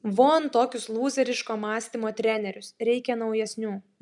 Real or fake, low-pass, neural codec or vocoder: real; 10.8 kHz; none